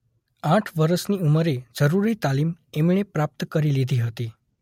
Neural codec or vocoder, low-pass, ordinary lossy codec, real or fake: none; 19.8 kHz; MP3, 64 kbps; real